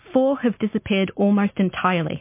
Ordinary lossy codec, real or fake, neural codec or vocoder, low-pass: MP3, 24 kbps; real; none; 3.6 kHz